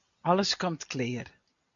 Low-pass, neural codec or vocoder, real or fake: 7.2 kHz; none; real